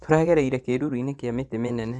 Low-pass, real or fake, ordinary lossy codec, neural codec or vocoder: 10.8 kHz; fake; none; vocoder, 24 kHz, 100 mel bands, Vocos